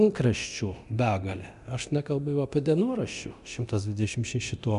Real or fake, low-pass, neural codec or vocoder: fake; 10.8 kHz; codec, 24 kHz, 0.9 kbps, DualCodec